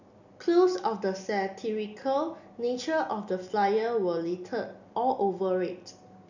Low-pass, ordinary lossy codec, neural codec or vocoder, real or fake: 7.2 kHz; none; none; real